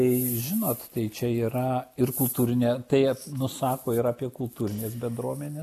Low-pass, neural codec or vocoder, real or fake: 14.4 kHz; none; real